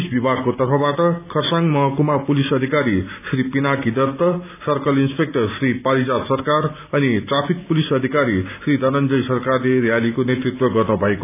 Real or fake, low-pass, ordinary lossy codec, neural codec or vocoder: real; 3.6 kHz; none; none